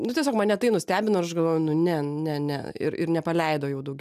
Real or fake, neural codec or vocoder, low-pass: real; none; 14.4 kHz